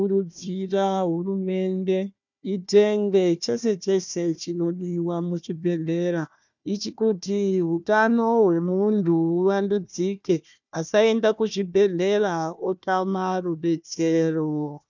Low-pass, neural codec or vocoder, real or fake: 7.2 kHz; codec, 16 kHz, 1 kbps, FunCodec, trained on Chinese and English, 50 frames a second; fake